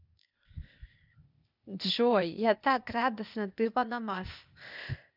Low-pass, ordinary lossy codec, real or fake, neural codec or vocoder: 5.4 kHz; none; fake; codec, 16 kHz, 0.8 kbps, ZipCodec